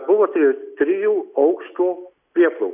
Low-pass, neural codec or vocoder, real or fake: 3.6 kHz; none; real